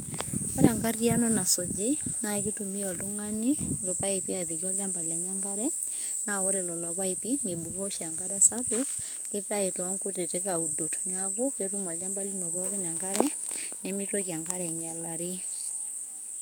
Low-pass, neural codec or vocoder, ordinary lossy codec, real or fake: none; codec, 44.1 kHz, 7.8 kbps, DAC; none; fake